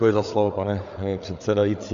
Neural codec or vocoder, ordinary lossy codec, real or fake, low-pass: codec, 16 kHz, 8 kbps, FreqCodec, larger model; MP3, 64 kbps; fake; 7.2 kHz